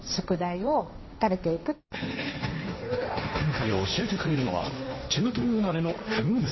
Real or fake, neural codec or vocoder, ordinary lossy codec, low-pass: fake; codec, 16 kHz, 1.1 kbps, Voila-Tokenizer; MP3, 24 kbps; 7.2 kHz